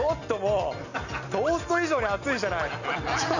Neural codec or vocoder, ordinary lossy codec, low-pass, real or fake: none; none; 7.2 kHz; real